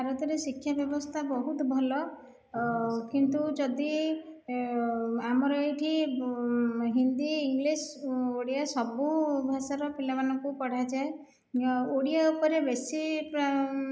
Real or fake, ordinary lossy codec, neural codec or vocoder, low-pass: real; none; none; none